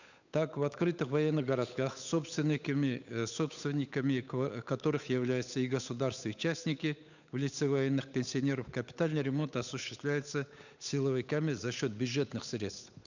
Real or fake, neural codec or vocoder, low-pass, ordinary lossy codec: fake; codec, 16 kHz, 8 kbps, FunCodec, trained on Chinese and English, 25 frames a second; 7.2 kHz; none